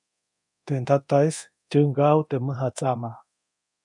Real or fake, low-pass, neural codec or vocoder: fake; 10.8 kHz; codec, 24 kHz, 0.9 kbps, DualCodec